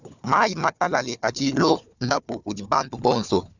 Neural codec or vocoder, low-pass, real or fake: codec, 16 kHz, 4 kbps, FunCodec, trained on Chinese and English, 50 frames a second; 7.2 kHz; fake